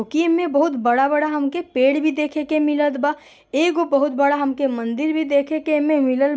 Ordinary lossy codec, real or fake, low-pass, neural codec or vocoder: none; real; none; none